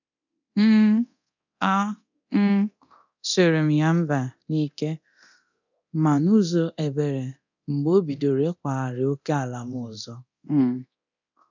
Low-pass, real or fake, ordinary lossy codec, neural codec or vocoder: 7.2 kHz; fake; none; codec, 24 kHz, 0.9 kbps, DualCodec